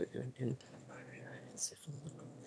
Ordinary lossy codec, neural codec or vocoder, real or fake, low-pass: none; autoencoder, 22.05 kHz, a latent of 192 numbers a frame, VITS, trained on one speaker; fake; none